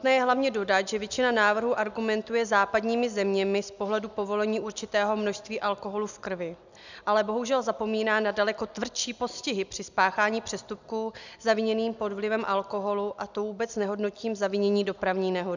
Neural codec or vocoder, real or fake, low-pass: none; real; 7.2 kHz